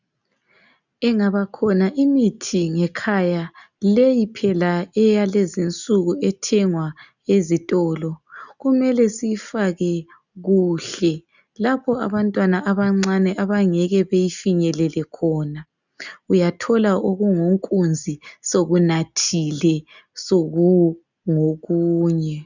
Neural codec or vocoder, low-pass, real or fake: none; 7.2 kHz; real